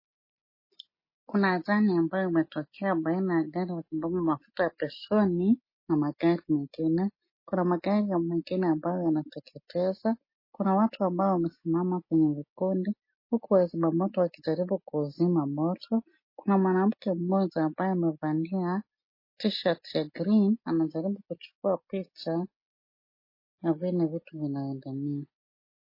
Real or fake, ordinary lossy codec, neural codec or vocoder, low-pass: real; MP3, 24 kbps; none; 5.4 kHz